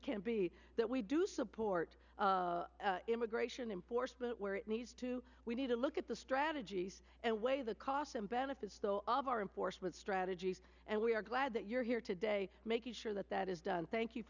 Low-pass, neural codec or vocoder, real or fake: 7.2 kHz; none; real